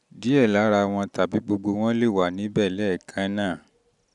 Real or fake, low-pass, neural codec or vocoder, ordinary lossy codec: real; 10.8 kHz; none; none